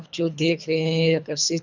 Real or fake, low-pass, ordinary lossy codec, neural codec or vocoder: fake; 7.2 kHz; none; codec, 24 kHz, 3 kbps, HILCodec